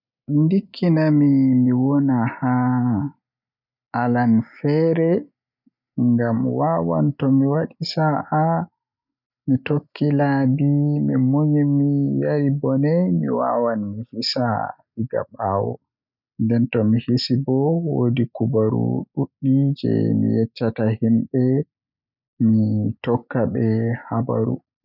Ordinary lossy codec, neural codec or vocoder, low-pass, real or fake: none; none; 5.4 kHz; real